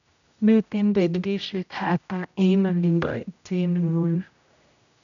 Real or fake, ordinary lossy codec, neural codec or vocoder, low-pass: fake; none; codec, 16 kHz, 0.5 kbps, X-Codec, HuBERT features, trained on general audio; 7.2 kHz